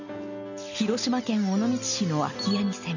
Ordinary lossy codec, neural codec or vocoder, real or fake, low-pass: none; none; real; 7.2 kHz